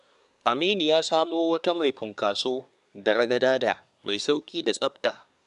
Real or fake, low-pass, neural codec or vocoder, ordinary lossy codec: fake; 10.8 kHz; codec, 24 kHz, 1 kbps, SNAC; none